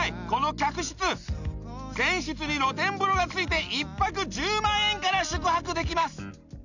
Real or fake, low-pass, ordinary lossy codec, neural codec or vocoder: real; 7.2 kHz; none; none